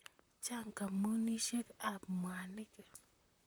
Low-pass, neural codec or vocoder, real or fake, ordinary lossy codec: none; vocoder, 44.1 kHz, 128 mel bands, Pupu-Vocoder; fake; none